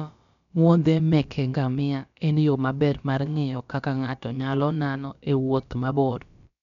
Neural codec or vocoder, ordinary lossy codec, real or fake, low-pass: codec, 16 kHz, about 1 kbps, DyCAST, with the encoder's durations; none; fake; 7.2 kHz